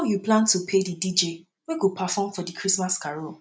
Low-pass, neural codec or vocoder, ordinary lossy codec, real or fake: none; none; none; real